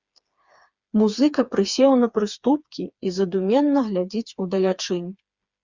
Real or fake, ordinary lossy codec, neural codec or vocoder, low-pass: fake; Opus, 64 kbps; codec, 16 kHz, 4 kbps, FreqCodec, smaller model; 7.2 kHz